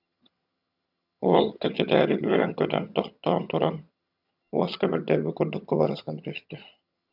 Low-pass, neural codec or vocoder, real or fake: 5.4 kHz; vocoder, 22.05 kHz, 80 mel bands, HiFi-GAN; fake